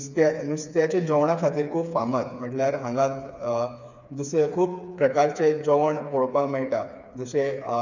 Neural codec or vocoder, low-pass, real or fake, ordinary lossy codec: codec, 16 kHz, 4 kbps, FreqCodec, smaller model; 7.2 kHz; fake; none